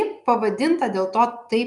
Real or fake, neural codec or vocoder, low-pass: real; none; 10.8 kHz